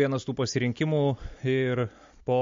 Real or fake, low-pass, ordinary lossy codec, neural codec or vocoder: real; 7.2 kHz; MP3, 48 kbps; none